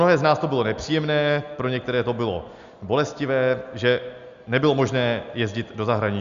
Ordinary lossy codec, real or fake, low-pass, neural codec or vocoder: Opus, 64 kbps; real; 7.2 kHz; none